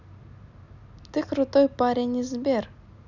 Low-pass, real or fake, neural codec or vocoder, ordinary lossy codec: 7.2 kHz; real; none; none